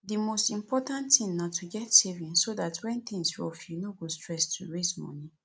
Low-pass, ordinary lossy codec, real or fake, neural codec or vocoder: none; none; real; none